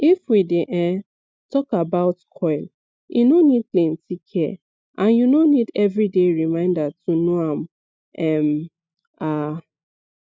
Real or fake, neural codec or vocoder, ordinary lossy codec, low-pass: real; none; none; none